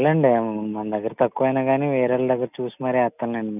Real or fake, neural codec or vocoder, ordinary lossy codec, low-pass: real; none; none; 3.6 kHz